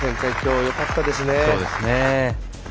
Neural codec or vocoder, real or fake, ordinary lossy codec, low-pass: none; real; none; none